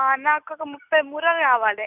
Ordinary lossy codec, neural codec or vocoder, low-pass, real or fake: none; none; 3.6 kHz; real